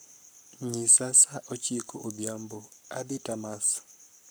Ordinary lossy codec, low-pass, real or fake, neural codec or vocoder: none; none; fake; codec, 44.1 kHz, 7.8 kbps, Pupu-Codec